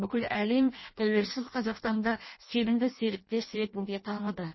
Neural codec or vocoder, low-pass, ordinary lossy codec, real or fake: codec, 16 kHz in and 24 kHz out, 0.6 kbps, FireRedTTS-2 codec; 7.2 kHz; MP3, 24 kbps; fake